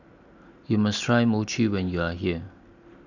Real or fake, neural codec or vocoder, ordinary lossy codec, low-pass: real; none; none; 7.2 kHz